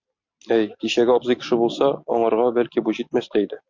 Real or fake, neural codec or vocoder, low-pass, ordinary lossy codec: real; none; 7.2 kHz; MP3, 48 kbps